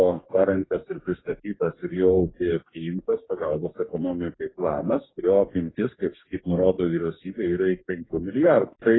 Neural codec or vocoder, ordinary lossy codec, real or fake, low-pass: codec, 44.1 kHz, 2.6 kbps, DAC; AAC, 16 kbps; fake; 7.2 kHz